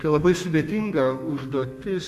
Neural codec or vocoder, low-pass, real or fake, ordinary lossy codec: codec, 32 kHz, 1.9 kbps, SNAC; 14.4 kHz; fake; MP3, 96 kbps